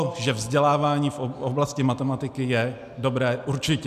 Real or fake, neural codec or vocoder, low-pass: real; none; 14.4 kHz